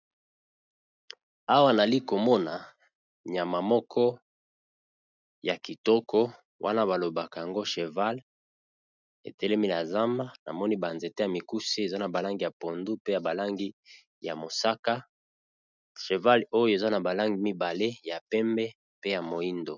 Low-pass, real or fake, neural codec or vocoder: 7.2 kHz; real; none